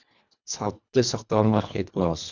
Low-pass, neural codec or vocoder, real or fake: 7.2 kHz; codec, 24 kHz, 1.5 kbps, HILCodec; fake